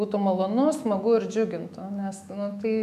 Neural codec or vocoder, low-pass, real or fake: autoencoder, 48 kHz, 128 numbers a frame, DAC-VAE, trained on Japanese speech; 14.4 kHz; fake